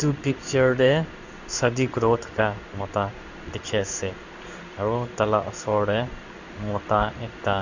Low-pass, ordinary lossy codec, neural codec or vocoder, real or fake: 7.2 kHz; Opus, 64 kbps; codec, 16 kHz in and 24 kHz out, 1 kbps, XY-Tokenizer; fake